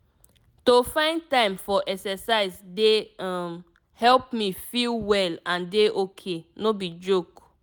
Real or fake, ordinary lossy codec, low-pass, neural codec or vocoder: real; none; none; none